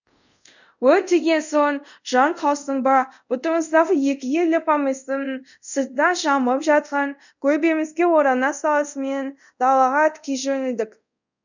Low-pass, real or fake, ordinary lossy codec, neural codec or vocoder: 7.2 kHz; fake; none; codec, 24 kHz, 0.5 kbps, DualCodec